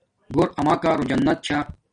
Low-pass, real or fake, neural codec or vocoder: 9.9 kHz; real; none